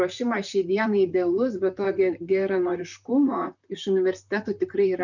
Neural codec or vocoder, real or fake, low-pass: vocoder, 44.1 kHz, 128 mel bands, Pupu-Vocoder; fake; 7.2 kHz